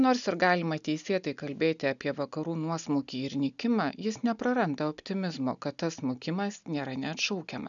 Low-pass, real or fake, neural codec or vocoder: 7.2 kHz; real; none